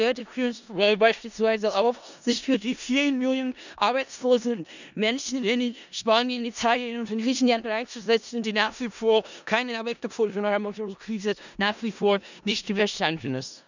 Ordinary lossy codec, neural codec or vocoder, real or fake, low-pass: none; codec, 16 kHz in and 24 kHz out, 0.4 kbps, LongCat-Audio-Codec, four codebook decoder; fake; 7.2 kHz